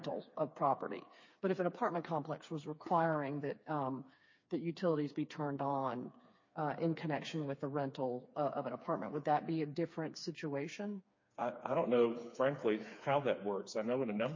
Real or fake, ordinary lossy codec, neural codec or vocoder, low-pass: fake; MP3, 32 kbps; codec, 16 kHz, 4 kbps, FreqCodec, smaller model; 7.2 kHz